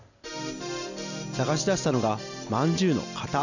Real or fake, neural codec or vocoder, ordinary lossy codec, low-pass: real; none; none; 7.2 kHz